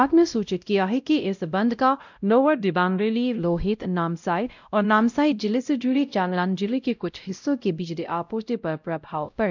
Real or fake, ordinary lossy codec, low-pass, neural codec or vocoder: fake; none; 7.2 kHz; codec, 16 kHz, 0.5 kbps, X-Codec, WavLM features, trained on Multilingual LibriSpeech